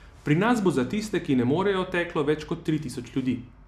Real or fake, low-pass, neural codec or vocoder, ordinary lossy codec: real; 14.4 kHz; none; none